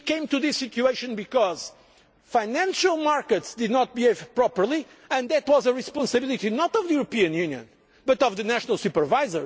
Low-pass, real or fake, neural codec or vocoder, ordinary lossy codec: none; real; none; none